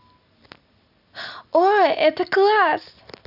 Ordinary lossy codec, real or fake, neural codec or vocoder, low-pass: none; real; none; 5.4 kHz